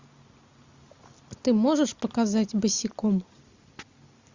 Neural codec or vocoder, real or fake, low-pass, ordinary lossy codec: none; real; 7.2 kHz; Opus, 64 kbps